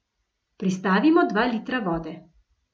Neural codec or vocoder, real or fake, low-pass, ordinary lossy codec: none; real; none; none